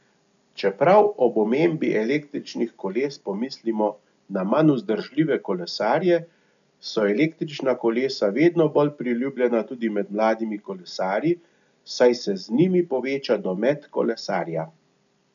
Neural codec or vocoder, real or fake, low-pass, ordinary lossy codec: none; real; 7.2 kHz; none